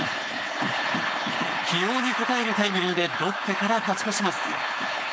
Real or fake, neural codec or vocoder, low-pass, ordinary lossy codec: fake; codec, 16 kHz, 4.8 kbps, FACodec; none; none